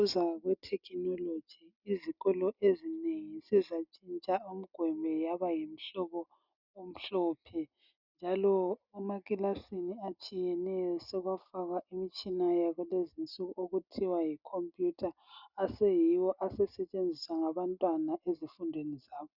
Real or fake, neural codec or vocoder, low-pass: real; none; 5.4 kHz